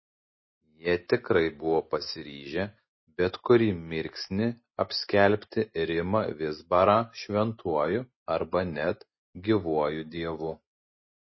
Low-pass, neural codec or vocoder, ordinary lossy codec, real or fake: 7.2 kHz; none; MP3, 24 kbps; real